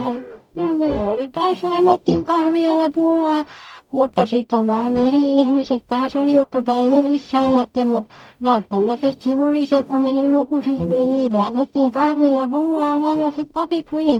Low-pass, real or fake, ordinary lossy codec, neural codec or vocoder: 19.8 kHz; fake; none; codec, 44.1 kHz, 0.9 kbps, DAC